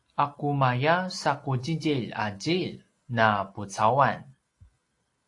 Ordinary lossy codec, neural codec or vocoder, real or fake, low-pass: AAC, 48 kbps; none; real; 10.8 kHz